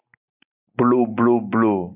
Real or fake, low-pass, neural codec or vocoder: real; 3.6 kHz; none